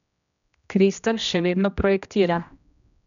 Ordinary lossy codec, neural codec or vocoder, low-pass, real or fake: none; codec, 16 kHz, 1 kbps, X-Codec, HuBERT features, trained on general audio; 7.2 kHz; fake